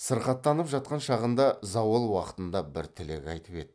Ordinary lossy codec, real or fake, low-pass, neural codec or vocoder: none; real; none; none